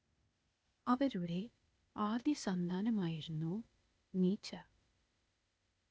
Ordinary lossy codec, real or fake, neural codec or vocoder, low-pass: none; fake; codec, 16 kHz, 0.8 kbps, ZipCodec; none